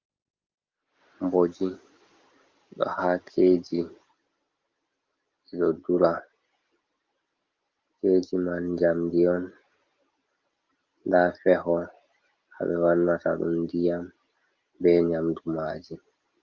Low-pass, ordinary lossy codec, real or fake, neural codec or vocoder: 7.2 kHz; Opus, 24 kbps; real; none